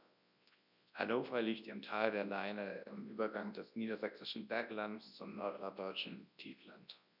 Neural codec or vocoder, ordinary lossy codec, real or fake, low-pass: codec, 24 kHz, 0.9 kbps, WavTokenizer, large speech release; none; fake; 5.4 kHz